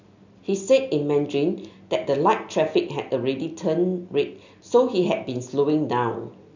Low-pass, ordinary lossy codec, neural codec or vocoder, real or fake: 7.2 kHz; none; none; real